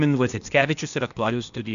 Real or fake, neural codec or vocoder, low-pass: fake; codec, 16 kHz, 0.8 kbps, ZipCodec; 7.2 kHz